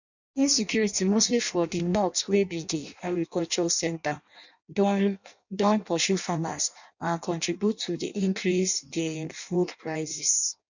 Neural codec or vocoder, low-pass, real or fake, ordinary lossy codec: codec, 16 kHz in and 24 kHz out, 0.6 kbps, FireRedTTS-2 codec; 7.2 kHz; fake; none